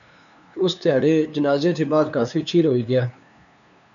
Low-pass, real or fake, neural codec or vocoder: 7.2 kHz; fake; codec, 16 kHz, 2 kbps, FunCodec, trained on LibriTTS, 25 frames a second